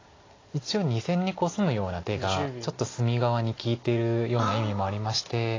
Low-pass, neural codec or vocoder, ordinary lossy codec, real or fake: 7.2 kHz; none; AAC, 32 kbps; real